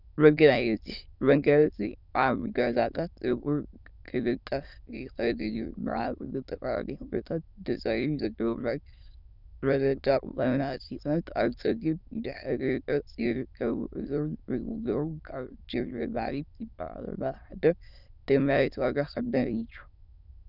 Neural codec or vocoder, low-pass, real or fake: autoencoder, 22.05 kHz, a latent of 192 numbers a frame, VITS, trained on many speakers; 5.4 kHz; fake